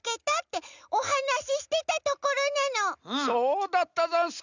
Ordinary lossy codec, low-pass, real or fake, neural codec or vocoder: none; 7.2 kHz; real; none